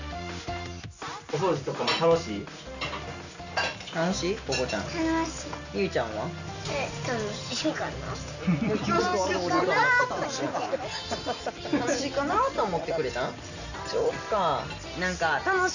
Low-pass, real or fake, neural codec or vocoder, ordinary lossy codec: 7.2 kHz; real; none; none